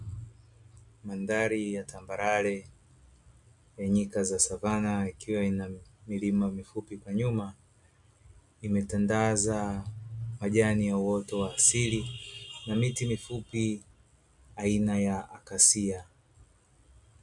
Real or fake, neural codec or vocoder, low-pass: real; none; 10.8 kHz